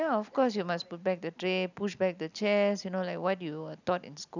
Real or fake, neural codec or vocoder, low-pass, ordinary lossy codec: real; none; 7.2 kHz; none